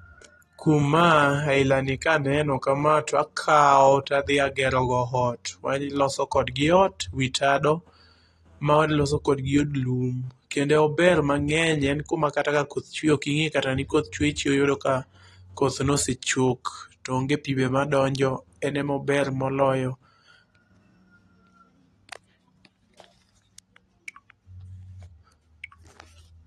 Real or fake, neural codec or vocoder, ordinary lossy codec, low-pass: real; none; AAC, 32 kbps; 19.8 kHz